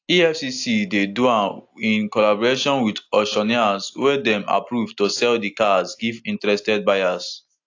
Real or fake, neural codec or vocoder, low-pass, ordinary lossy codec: real; none; 7.2 kHz; AAC, 48 kbps